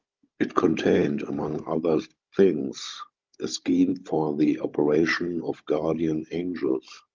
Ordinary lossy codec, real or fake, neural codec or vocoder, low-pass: Opus, 32 kbps; fake; codec, 16 kHz, 16 kbps, FunCodec, trained on Chinese and English, 50 frames a second; 7.2 kHz